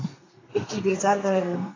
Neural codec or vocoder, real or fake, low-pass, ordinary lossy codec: codec, 32 kHz, 1.9 kbps, SNAC; fake; 7.2 kHz; AAC, 32 kbps